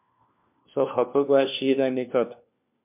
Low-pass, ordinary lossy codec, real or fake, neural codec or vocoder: 3.6 kHz; MP3, 32 kbps; fake; codec, 16 kHz, 1 kbps, FunCodec, trained on LibriTTS, 50 frames a second